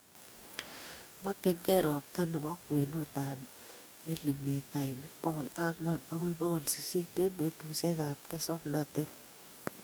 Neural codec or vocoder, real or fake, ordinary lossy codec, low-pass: codec, 44.1 kHz, 2.6 kbps, DAC; fake; none; none